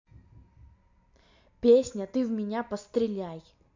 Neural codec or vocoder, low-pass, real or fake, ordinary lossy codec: none; 7.2 kHz; real; MP3, 48 kbps